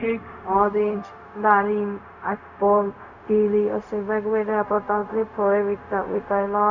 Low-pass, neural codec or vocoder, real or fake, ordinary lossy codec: 7.2 kHz; codec, 16 kHz, 0.4 kbps, LongCat-Audio-Codec; fake; none